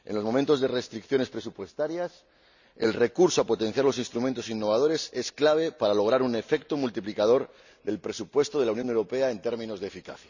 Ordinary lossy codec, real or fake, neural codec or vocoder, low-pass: none; real; none; 7.2 kHz